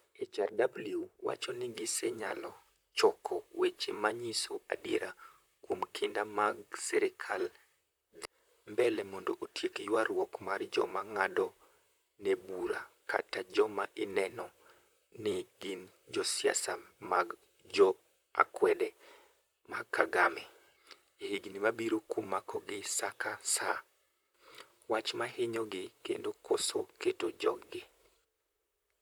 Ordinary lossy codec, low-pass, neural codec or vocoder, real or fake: none; none; vocoder, 44.1 kHz, 128 mel bands, Pupu-Vocoder; fake